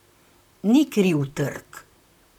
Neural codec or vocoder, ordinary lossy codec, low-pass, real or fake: vocoder, 44.1 kHz, 128 mel bands, Pupu-Vocoder; none; 19.8 kHz; fake